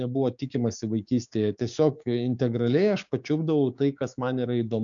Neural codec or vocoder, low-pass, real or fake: codec, 16 kHz, 6 kbps, DAC; 7.2 kHz; fake